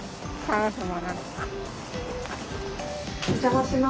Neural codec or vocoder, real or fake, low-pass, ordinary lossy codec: none; real; none; none